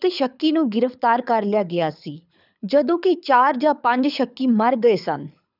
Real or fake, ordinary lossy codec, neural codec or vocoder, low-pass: fake; none; codec, 24 kHz, 6 kbps, HILCodec; 5.4 kHz